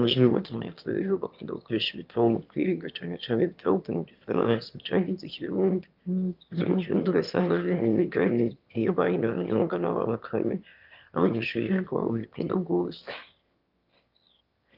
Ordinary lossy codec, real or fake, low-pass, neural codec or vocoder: Opus, 24 kbps; fake; 5.4 kHz; autoencoder, 22.05 kHz, a latent of 192 numbers a frame, VITS, trained on one speaker